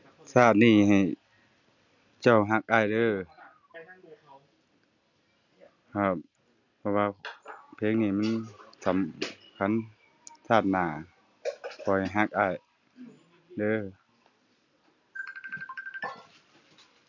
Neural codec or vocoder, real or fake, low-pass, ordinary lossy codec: none; real; 7.2 kHz; none